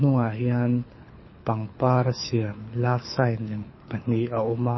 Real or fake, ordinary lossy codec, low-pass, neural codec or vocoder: fake; MP3, 24 kbps; 7.2 kHz; codec, 24 kHz, 6 kbps, HILCodec